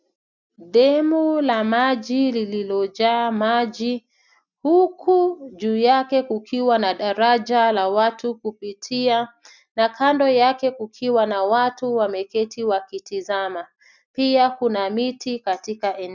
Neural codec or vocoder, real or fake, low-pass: none; real; 7.2 kHz